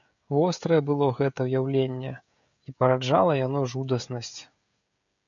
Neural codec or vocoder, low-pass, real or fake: codec, 16 kHz, 16 kbps, FreqCodec, smaller model; 7.2 kHz; fake